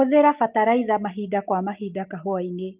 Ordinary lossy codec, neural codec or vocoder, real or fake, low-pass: Opus, 32 kbps; none; real; 3.6 kHz